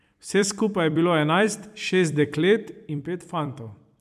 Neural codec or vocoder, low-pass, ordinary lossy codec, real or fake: vocoder, 44.1 kHz, 128 mel bands every 256 samples, BigVGAN v2; 14.4 kHz; none; fake